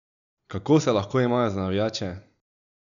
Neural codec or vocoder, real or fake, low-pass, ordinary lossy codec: none; real; 7.2 kHz; none